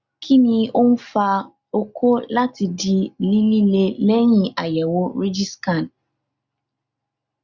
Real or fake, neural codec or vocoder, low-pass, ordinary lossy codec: real; none; 7.2 kHz; Opus, 64 kbps